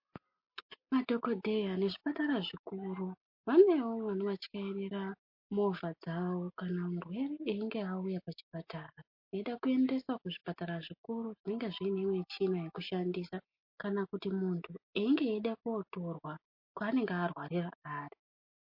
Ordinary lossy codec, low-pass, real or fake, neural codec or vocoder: MP3, 32 kbps; 5.4 kHz; real; none